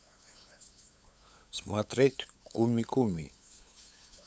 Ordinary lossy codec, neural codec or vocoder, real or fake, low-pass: none; codec, 16 kHz, 8 kbps, FunCodec, trained on LibriTTS, 25 frames a second; fake; none